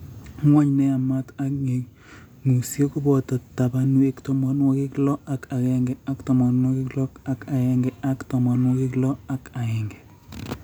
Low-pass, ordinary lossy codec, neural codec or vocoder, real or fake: none; none; none; real